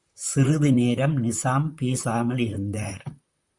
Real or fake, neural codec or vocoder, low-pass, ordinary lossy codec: fake; vocoder, 44.1 kHz, 128 mel bands, Pupu-Vocoder; 10.8 kHz; Opus, 64 kbps